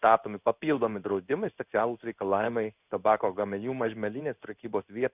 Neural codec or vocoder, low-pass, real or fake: codec, 16 kHz in and 24 kHz out, 1 kbps, XY-Tokenizer; 3.6 kHz; fake